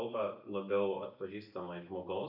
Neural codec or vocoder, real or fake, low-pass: none; real; 5.4 kHz